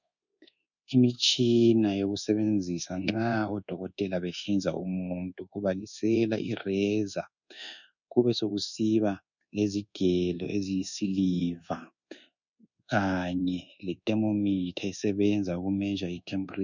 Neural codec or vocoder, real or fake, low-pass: codec, 24 kHz, 1.2 kbps, DualCodec; fake; 7.2 kHz